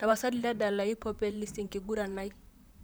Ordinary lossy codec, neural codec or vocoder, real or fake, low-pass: none; vocoder, 44.1 kHz, 128 mel bands, Pupu-Vocoder; fake; none